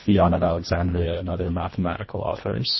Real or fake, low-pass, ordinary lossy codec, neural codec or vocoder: fake; 7.2 kHz; MP3, 24 kbps; codec, 24 kHz, 1.5 kbps, HILCodec